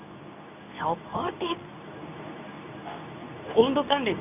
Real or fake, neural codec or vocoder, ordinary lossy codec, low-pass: fake; codec, 24 kHz, 0.9 kbps, WavTokenizer, medium speech release version 2; none; 3.6 kHz